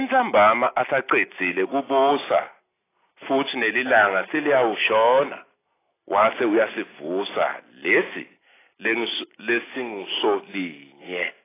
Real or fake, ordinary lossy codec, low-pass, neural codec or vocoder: real; AAC, 16 kbps; 3.6 kHz; none